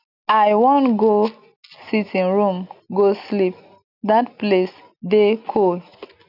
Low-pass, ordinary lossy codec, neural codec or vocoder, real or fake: 5.4 kHz; none; none; real